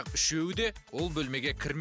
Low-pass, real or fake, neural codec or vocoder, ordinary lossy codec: none; real; none; none